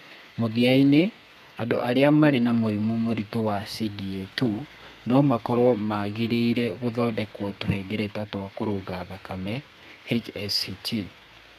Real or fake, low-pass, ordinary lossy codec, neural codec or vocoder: fake; 14.4 kHz; none; codec, 32 kHz, 1.9 kbps, SNAC